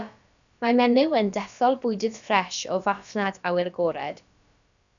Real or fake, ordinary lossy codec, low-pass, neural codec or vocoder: fake; MP3, 96 kbps; 7.2 kHz; codec, 16 kHz, about 1 kbps, DyCAST, with the encoder's durations